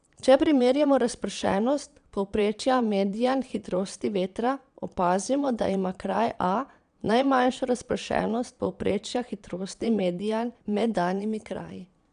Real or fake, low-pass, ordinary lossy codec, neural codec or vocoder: fake; 9.9 kHz; none; vocoder, 22.05 kHz, 80 mel bands, Vocos